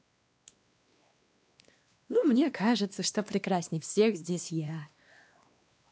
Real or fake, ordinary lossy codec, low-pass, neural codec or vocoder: fake; none; none; codec, 16 kHz, 2 kbps, X-Codec, WavLM features, trained on Multilingual LibriSpeech